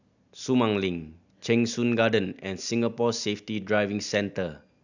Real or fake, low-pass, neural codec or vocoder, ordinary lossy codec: real; 7.2 kHz; none; none